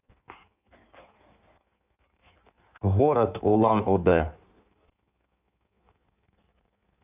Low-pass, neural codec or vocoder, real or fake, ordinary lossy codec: 3.6 kHz; codec, 16 kHz in and 24 kHz out, 1.1 kbps, FireRedTTS-2 codec; fake; none